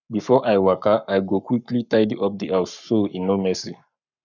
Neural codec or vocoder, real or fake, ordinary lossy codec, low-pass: codec, 44.1 kHz, 7.8 kbps, Pupu-Codec; fake; none; 7.2 kHz